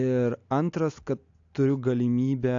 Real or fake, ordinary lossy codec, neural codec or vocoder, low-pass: real; AAC, 64 kbps; none; 7.2 kHz